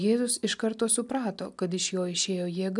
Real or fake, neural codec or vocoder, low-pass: real; none; 10.8 kHz